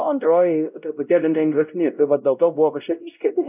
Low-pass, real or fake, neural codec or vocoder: 3.6 kHz; fake; codec, 16 kHz, 0.5 kbps, X-Codec, WavLM features, trained on Multilingual LibriSpeech